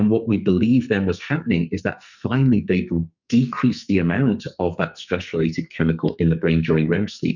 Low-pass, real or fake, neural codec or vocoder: 7.2 kHz; fake; codec, 44.1 kHz, 2.6 kbps, SNAC